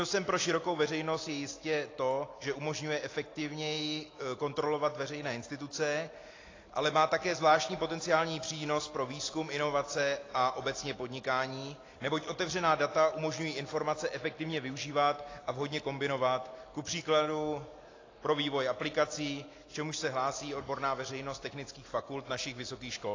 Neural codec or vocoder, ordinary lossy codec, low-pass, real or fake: none; AAC, 32 kbps; 7.2 kHz; real